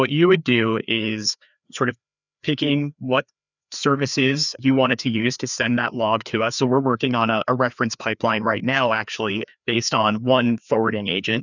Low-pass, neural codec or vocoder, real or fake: 7.2 kHz; codec, 16 kHz, 2 kbps, FreqCodec, larger model; fake